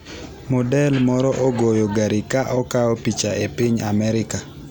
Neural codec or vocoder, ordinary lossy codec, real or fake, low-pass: none; none; real; none